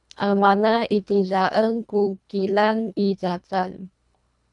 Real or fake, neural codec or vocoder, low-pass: fake; codec, 24 kHz, 1.5 kbps, HILCodec; 10.8 kHz